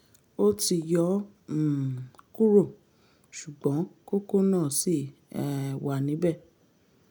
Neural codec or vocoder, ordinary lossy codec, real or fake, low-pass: none; none; real; none